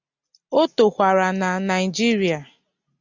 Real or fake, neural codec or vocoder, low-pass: real; none; 7.2 kHz